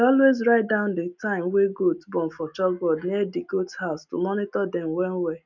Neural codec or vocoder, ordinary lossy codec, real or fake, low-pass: none; none; real; 7.2 kHz